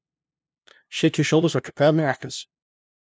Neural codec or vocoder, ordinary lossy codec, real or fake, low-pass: codec, 16 kHz, 0.5 kbps, FunCodec, trained on LibriTTS, 25 frames a second; none; fake; none